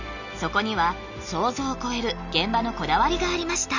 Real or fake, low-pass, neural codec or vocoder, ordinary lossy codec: real; 7.2 kHz; none; none